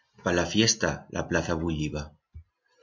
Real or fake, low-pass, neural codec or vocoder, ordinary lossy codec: real; 7.2 kHz; none; MP3, 48 kbps